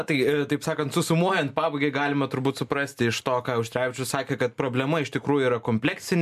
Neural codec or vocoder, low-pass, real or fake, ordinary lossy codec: none; 14.4 kHz; real; MP3, 96 kbps